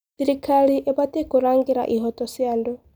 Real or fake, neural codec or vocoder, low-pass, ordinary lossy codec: real; none; none; none